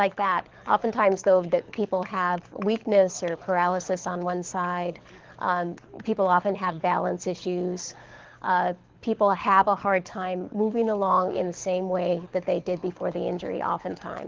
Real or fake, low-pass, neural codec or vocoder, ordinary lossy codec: fake; 7.2 kHz; codec, 24 kHz, 6 kbps, HILCodec; Opus, 16 kbps